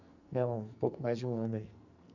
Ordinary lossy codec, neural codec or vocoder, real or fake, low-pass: none; codec, 44.1 kHz, 2.6 kbps, SNAC; fake; 7.2 kHz